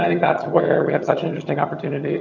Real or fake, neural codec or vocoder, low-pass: fake; vocoder, 22.05 kHz, 80 mel bands, HiFi-GAN; 7.2 kHz